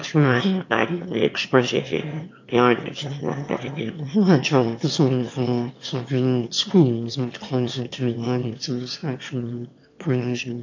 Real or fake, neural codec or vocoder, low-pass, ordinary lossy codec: fake; autoencoder, 22.05 kHz, a latent of 192 numbers a frame, VITS, trained on one speaker; 7.2 kHz; AAC, 48 kbps